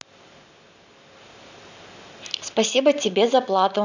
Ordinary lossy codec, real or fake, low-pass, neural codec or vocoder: none; real; 7.2 kHz; none